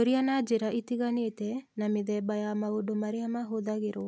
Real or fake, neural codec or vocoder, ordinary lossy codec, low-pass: real; none; none; none